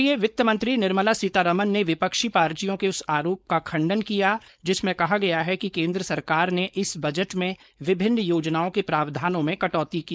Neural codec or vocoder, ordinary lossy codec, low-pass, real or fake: codec, 16 kHz, 4.8 kbps, FACodec; none; none; fake